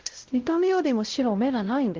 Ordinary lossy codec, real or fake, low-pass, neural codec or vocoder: Opus, 16 kbps; fake; 7.2 kHz; codec, 16 kHz, 0.5 kbps, X-Codec, WavLM features, trained on Multilingual LibriSpeech